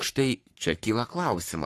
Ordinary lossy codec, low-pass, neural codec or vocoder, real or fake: AAC, 64 kbps; 14.4 kHz; codec, 44.1 kHz, 3.4 kbps, Pupu-Codec; fake